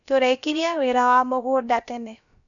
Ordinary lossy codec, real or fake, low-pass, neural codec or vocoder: none; fake; 7.2 kHz; codec, 16 kHz, about 1 kbps, DyCAST, with the encoder's durations